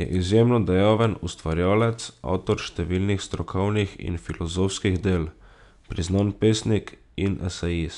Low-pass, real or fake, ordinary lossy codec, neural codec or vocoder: 10.8 kHz; real; none; none